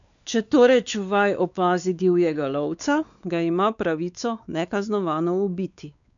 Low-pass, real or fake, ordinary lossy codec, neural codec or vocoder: 7.2 kHz; fake; none; codec, 16 kHz, 4 kbps, X-Codec, WavLM features, trained on Multilingual LibriSpeech